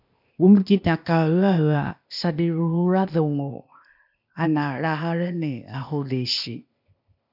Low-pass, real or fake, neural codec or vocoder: 5.4 kHz; fake; codec, 16 kHz, 0.8 kbps, ZipCodec